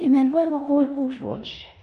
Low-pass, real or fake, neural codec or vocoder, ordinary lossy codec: 10.8 kHz; fake; codec, 16 kHz in and 24 kHz out, 0.9 kbps, LongCat-Audio-Codec, four codebook decoder; none